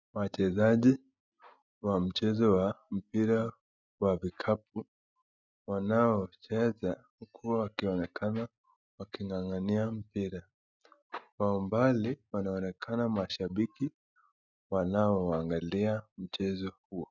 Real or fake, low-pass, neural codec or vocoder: real; 7.2 kHz; none